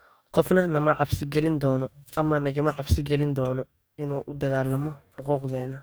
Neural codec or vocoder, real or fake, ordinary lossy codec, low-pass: codec, 44.1 kHz, 2.6 kbps, DAC; fake; none; none